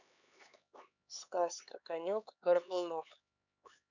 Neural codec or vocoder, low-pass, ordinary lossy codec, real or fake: codec, 16 kHz, 4 kbps, X-Codec, HuBERT features, trained on LibriSpeech; 7.2 kHz; none; fake